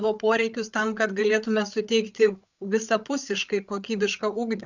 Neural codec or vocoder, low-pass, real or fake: codec, 16 kHz, 8 kbps, FreqCodec, larger model; 7.2 kHz; fake